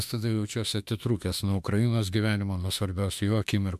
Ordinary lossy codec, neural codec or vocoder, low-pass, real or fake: MP3, 96 kbps; autoencoder, 48 kHz, 32 numbers a frame, DAC-VAE, trained on Japanese speech; 14.4 kHz; fake